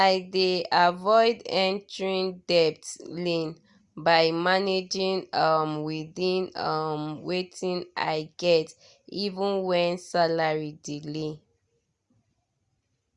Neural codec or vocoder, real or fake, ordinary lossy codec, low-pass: none; real; Opus, 64 kbps; 10.8 kHz